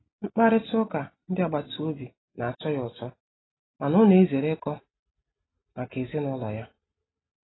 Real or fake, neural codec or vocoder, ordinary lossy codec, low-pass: real; none; AAC, 16 kbps; 7.2 kHz